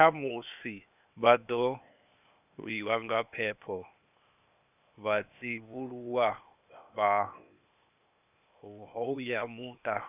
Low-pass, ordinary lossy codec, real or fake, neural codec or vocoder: 3.6 kHz; none; fake; codec, 16 kHz, 0.8 kbps, ZipCodec